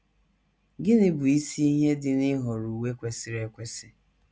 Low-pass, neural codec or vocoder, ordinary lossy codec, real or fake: none; none; none; real